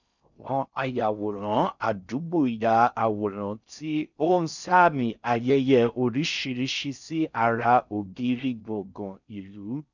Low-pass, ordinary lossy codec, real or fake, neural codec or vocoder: 7.2 kHz; none; fake; codec, 16 kHz in and 24 kHz out, 0.6 kbps, FocalCodec, streaming, 4096 codes